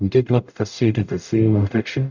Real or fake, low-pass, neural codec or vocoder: fake; 7.2 kHz; codec, 44.1 kHz, 0.9 kbps, DAC